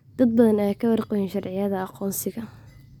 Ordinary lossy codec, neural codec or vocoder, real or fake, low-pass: none; none; real; 19.8 kHz